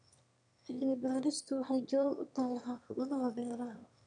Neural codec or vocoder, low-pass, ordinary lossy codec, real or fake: autoencoder, 22.05 kHz, a latent of 192 numbers a frame, VITS, trained on one speaker; 9.9 kHz; none; fake